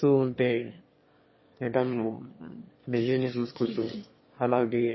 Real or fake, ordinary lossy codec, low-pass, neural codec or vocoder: fake; MP3, 24 kbps; 7.2 kHz; autoencoder, 22.05 kHz, a latent of 192 numbers a frame, VITS, trained on one speaker